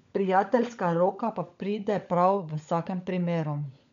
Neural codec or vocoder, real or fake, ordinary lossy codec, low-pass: codec, 16 kHz, 16 kbps, FunCodec, trained on LibriTTS, 50 frames a second; fake; MP3, 64 kbps; 7.2 kHz